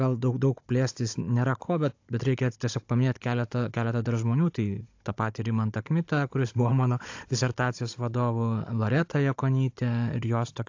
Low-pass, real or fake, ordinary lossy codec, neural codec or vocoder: 7.2 kHz; fake; AAC, 48 kbps; codec, 16 kHz, 16 kbps, FunCodec, trained on Chinese and English, 50 frames a second